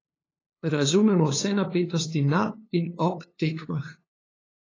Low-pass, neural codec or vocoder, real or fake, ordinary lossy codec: 7.2 kHz; codec, 16 kHz, 2 kbps, FunCodec, trained on LibriTTS, 25 frames a second; fake; AAC, 32 kbps